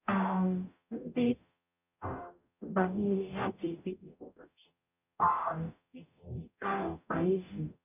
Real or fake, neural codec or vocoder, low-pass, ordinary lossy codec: fake; codec, 44.1 kHz, 0.9 kbps, DAC; 3.6 kHz; none